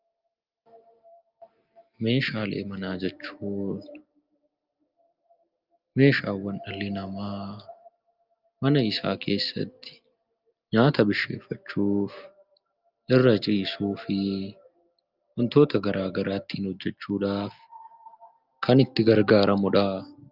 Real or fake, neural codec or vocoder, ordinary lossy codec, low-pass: real; none; Opus, 24 kbps; 5.4 kHz